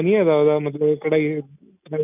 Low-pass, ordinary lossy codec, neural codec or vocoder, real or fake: 3.6 kHz; none; none; real